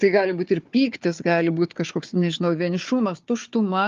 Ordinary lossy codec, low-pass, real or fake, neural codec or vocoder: Opus, 32 kbps; 7.2 kHz; fake; codec, 16 kHz, 4 kbps, FunCodec, trained on Chinese and English, 50 frames a second